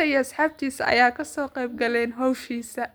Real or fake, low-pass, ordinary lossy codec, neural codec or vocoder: real; none; none; none